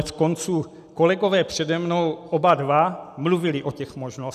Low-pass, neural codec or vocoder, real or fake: 14.4 kHz; none; real